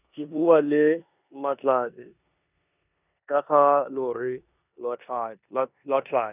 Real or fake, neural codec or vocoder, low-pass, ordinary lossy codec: fake; codec, 16 kHz in and 24 kHz out, 0.9 kbps, LongCat-Audio-Codec, four codebook decoder; 3.6 kHz; none